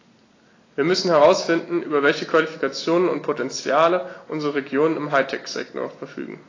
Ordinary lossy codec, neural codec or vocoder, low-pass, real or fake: AAC, 32 kbps; none; 7.2 kHz; real